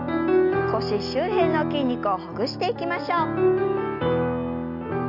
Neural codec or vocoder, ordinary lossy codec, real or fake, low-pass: none; none; real; 5.4 kHz